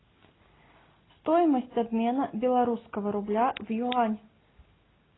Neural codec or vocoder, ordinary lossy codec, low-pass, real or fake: none; AAC, 16 kbps; 7.2 kHz; real